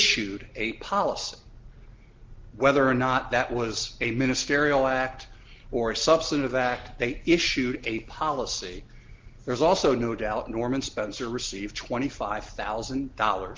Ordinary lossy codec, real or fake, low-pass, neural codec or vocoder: Opus, 16 kbps; real; 7.2 kHz; none